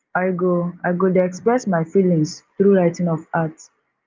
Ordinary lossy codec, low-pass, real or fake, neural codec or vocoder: Opus, 24 kbps; 7.2 kHz; real; none